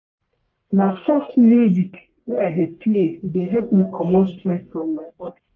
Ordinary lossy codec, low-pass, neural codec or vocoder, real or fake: Opus, 32 kbps; 7.2 kHz; codec, 44.1 kHz, 1.7 kbps, Pupu-Codec; fake